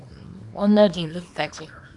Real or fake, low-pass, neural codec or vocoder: fake; 10.8 kHz; codec, 24 kHz, 0.9 kbps, WavTokenizer, small release